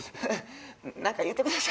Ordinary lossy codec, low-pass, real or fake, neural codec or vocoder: none; none; real; none